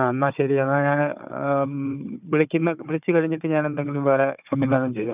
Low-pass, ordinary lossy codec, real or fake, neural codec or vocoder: 3.6 kHz; none; fake; codec, 16 kHz, 4 kbps, FunCodec, trained on Chinese and English, 50 frames a second